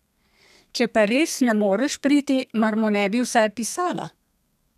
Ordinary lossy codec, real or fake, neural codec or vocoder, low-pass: none; fake; codec, 32 kHz, 1.9 kbps, SNAC; 14.4 kHz